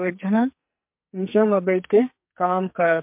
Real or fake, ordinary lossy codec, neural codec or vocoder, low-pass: fake; MP3, 32 kbps; codec, 44.1 kHz, 2.6 kbps, SNAC; 3.6 kHz